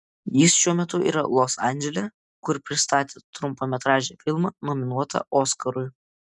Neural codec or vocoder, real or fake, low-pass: none; real; 10.8 kHz